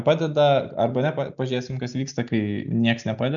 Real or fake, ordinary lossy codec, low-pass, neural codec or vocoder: real; MP3, 96 kbps; 7.2 kHz; none